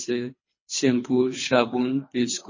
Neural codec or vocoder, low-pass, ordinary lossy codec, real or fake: codec, 24 kHz, 3 kbps, HILCodec; 7.2 kHz; MP3, 32 kbps; fake